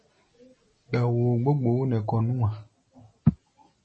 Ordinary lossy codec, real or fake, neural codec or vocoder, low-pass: MP3, 32 kbps; real; none; 9.9 kHz